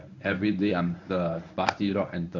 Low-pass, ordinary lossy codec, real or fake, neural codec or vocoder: 7.2 kHz; none; fake; codec, 24 kHz, 0.9 kbps, WavTokenizer, medium speech release version 1